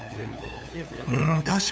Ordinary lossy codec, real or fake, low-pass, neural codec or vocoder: none; fake; none; codec, 16 kHz, 8 kbps, FunCodec, trained on LibriTTS, 25 frames a second